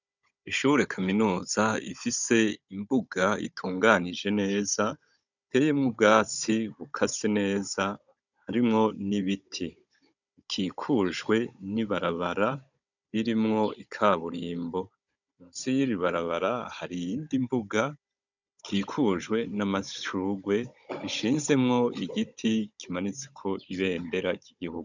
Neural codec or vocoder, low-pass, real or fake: codec, 16 kHz, 16 kbps, FunCodec, trained on Chinese and English, 50 frames a second; 7.2 kHz; fake